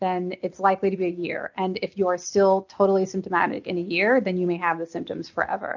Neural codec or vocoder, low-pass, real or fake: none; 7.2 kHz; real